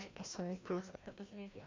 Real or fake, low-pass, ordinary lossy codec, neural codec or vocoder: fake; 7.2 kHz; MP3, 64 kbps; codec, 16 kHz, 1 kbps, FreqCodec, larger model